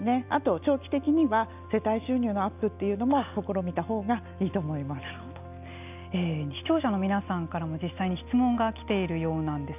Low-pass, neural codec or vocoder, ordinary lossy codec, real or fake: 3.6 kHz; none; none; real